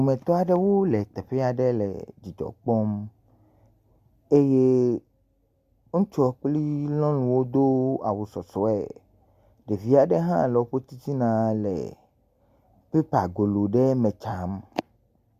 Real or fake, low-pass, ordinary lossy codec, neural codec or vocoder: real; 14.4 kHz; Opus, 64 kbps; none